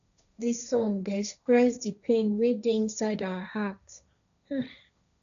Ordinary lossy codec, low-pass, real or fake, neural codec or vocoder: none; 7.2 kHz; fake; codec, 16 kHz, 1.1 kbps, Voila-Tokenizer